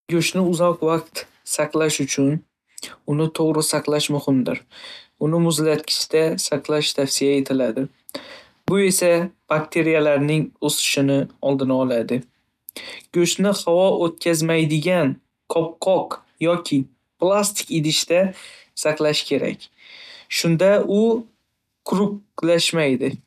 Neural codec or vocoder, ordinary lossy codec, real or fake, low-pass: none; none; real; 14.4 kHz